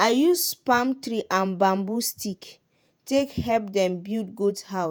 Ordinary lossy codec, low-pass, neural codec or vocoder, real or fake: none; none; vocoder, 48 kHz, 128 mel bands, Vocos; fake